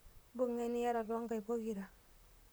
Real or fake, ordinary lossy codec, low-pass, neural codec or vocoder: fake; none; none; vocoder, 44.1 kHz, 128 mel bands, Pupu-Vocoder